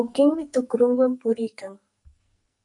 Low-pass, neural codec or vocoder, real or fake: 10.8 kHz; codec, 44.1 kHz, 2.6 kbps, SNAC; fake